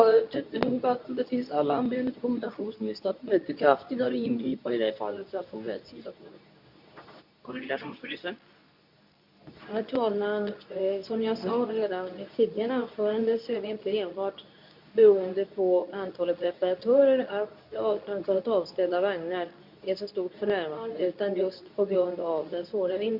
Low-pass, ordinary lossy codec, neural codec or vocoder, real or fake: 5.4 kHz; none; codec, 24 kHz, 0.9 kbps, WavTokenizer, medium speech release version 2; fake